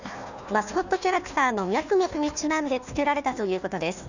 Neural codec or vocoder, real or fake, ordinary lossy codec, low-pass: codec, 16 kHz, 1 kbps, FunCodec, trained on Chinese and English, 50 frames a second; fake; none; 7.2 kHz